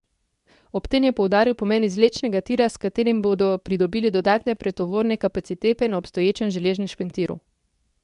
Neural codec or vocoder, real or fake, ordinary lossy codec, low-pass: codec, 24 kHz, 0.9 kbps, WavTokenizer, medium speech release version 2; fake; none; 10.8 kHz